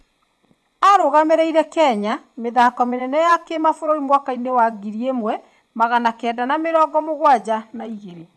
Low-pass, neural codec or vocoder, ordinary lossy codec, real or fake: none; vocoder, 24 kHz, 100 mel bands, Vocos; none; fake